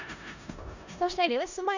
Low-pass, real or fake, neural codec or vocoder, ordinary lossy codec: 7.2 kHz; fake; codec, 16 kHz in and 24 kHz out, 0.4 kbps, LongCat-Audio-Codec, four codebook decoder; none